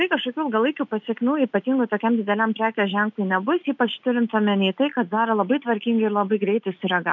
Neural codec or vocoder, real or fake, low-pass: none; real; 7.2 kHz